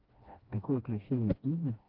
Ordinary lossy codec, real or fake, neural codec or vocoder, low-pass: Opus, 16 kbps; fake; codec, 16 kHz, 1 kbps, FreqCodec, smaller model; 5.4 kHz